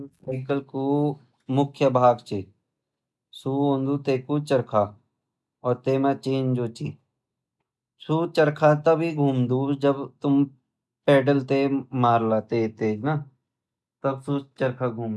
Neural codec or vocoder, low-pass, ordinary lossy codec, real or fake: none; none; none; real